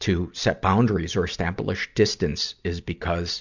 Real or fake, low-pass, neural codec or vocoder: real; 7.2 kHz; none